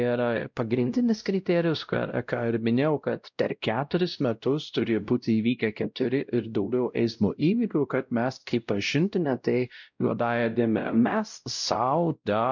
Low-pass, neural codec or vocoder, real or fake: 7.2 kHz; codec, 16 kHz, 0.5 kbps, X-Codec, WavLM features, trained on Multilingual LibriSpeech; fake